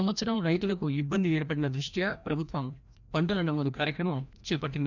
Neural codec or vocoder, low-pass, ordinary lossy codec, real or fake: codec, 16 kHz, 1 kbps, FreqCodec, larger model; 7.2 kHz; none; fake